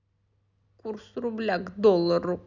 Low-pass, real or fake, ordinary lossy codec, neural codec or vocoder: 7.2 kHz; real; none; none